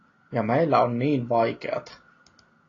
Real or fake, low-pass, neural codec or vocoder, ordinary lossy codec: real; 7.2 kHz; none; AAC, 32 kbps